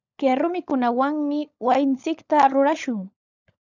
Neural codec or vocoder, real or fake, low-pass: codec, 16 kHz, 16 kbps, FunCodec, trained on LibriTTS, 50 frames a second; fake; 7.2 kHz